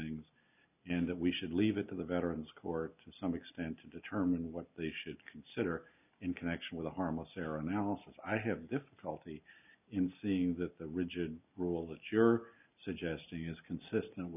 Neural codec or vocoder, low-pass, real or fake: none; 3.6 kHz; real